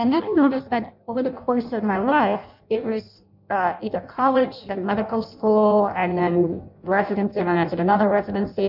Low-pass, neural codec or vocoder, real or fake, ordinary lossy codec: 5.4 kHz; codec, 16 kHz in and 24 kHz out, 0.6 kbps, FireRedTTS-2 codec; fake; MP3, 48 kbps